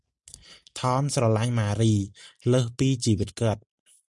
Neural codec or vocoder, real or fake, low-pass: none; real; 10.8 kHz